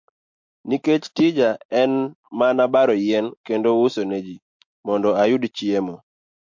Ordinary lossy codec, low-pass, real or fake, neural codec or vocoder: MP3, 48 kbps; 7.2 kHz; real; none